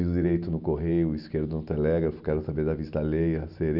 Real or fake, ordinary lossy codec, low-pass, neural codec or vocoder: real; none; 5.4 kHz; none